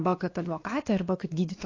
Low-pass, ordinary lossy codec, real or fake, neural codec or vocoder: 7.2 kHz; AAC, 32 kbps; fake; codec, 16 kHz, 2 kbps, X-Codec, WavLM features, trained on Multilingual LibriSpeech